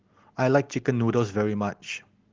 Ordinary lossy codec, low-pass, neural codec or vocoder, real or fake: Opus, 16 kbps; 7.2 kHz; none; real